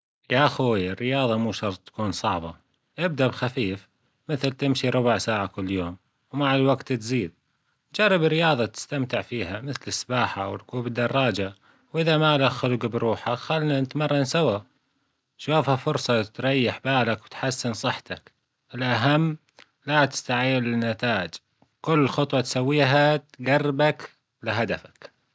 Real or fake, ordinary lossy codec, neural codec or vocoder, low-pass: real; none; none; none